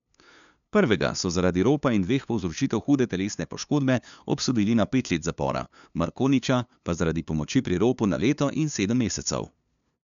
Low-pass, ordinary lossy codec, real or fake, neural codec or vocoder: 7.2 kHz; none; fake; codec, 16 kHz, 2 kbps, FunCodec, trained on LibriTTS, 25 frames a second